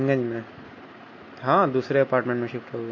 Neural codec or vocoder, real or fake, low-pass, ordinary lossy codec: none; real; 7.2 kHz; MP3, 32 kbps